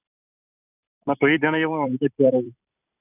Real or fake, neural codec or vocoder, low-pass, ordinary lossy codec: fake; vocoder, 44.1 kHz, 128 mel bands every 256 samples, BigVGAN v2; 3.6 kHz; none